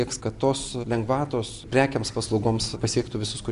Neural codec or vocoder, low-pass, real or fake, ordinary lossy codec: none; 10.8 kHz; real; Opus, 64 kbps